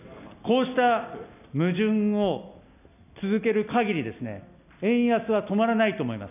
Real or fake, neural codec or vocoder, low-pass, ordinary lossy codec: real; none; 3.6 kHz; none